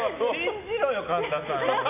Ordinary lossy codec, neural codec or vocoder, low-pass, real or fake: none; none; 3.6 kHz; real